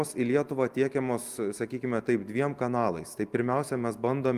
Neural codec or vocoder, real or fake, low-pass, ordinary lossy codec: none; real; 14.4 kHz; Opus, 32 kbps